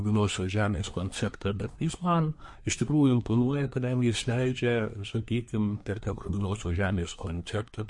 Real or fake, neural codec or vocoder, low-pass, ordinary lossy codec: fake; codec, 24 kHz, 1 kbps, SNAC; 10.8 kHz; MP3, 48 kbps